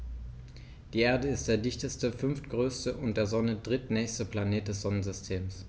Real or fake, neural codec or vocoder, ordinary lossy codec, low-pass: real; none; none; none